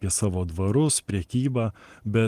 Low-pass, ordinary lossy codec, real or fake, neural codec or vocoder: 14.4 kHz; Opus, 24 kbps; real; none